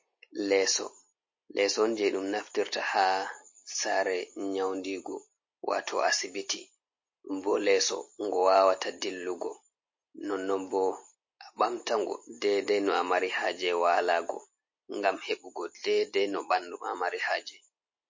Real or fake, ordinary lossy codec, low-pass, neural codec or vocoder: real; MP3, 32 kbps; 7.2 kHz; none